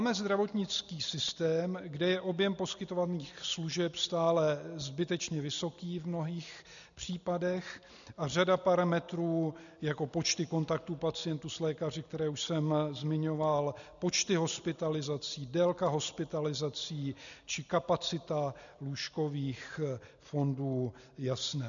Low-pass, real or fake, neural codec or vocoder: 7.2 kHz; real; none